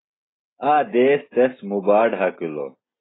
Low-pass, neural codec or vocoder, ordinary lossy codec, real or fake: 7.2 kHz; none; AAC, 16 kbps; real